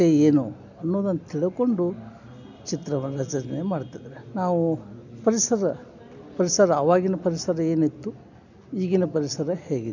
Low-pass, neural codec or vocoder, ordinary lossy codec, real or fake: 7.2 kHz; none; none; real